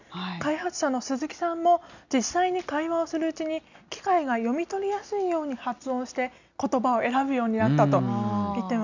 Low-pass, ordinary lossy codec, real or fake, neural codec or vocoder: 7.2 kHz; none; real; none